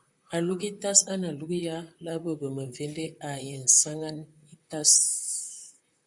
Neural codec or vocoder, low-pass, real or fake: vocoder, 44.1 kHz, 128 mel bands, Pupu-Vocoder; 10.8 kHz; fake